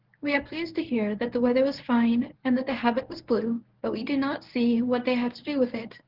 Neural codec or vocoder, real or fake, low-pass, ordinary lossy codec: codec, 24 kHz, 0.9 kbps, WavTokenizer, medium speech release version 1; fake; 5.4 kHz; Opus, 16 kbps